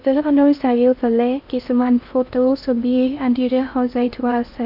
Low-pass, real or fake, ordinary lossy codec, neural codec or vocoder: 5.4 kHz; fake; none; codec, 16 kHz in and 24 kHz out, 0.6 kbps, FocalCodec, streaming, 2048 codes